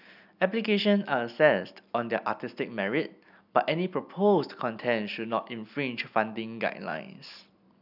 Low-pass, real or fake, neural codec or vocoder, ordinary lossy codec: 5.4 kHz; real; none; none